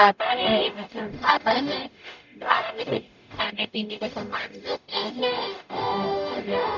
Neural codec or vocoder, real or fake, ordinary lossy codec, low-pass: codec, 44.1 kHz, 0.9 kbps, DAC; fake; none; 7.2 kHz